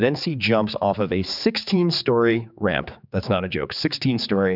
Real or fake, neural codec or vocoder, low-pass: fake; codec, 16 kHz, 4 kbps, X-Codec, HuBERT features, trained on general audio; 5.4 kHz